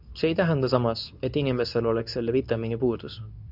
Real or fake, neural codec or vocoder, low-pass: fake; codec, 24 kHz, 0.9 kbps, WavTokenizer, medium speech release version 2; 5.4 kHz